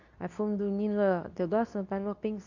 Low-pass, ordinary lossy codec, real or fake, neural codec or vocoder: 7.2 kHz; none; fake; codec, 24 kHz, 0.9 kbps, WavTokenizer, medium speech release version 2